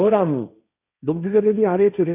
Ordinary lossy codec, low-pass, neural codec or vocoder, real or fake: none; 3.6 kHz; codec, 16 kHz, 1.1 kbps, Voila-Tokenizer; fake